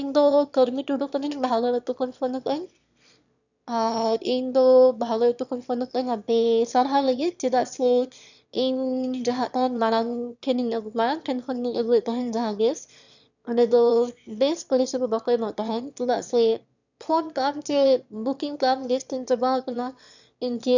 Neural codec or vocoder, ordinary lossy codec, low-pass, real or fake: autoencoder, 22.05 kHz, a latent of 192 numbers a frame, VITS, trained on one speaker; none; 7.2 kHz; fake